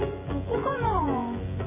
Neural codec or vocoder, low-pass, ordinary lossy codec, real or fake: vocoder, 24 kHz, 100 mel bands, Vocos; 3.6 kHz; MP3, 16 kbps; fake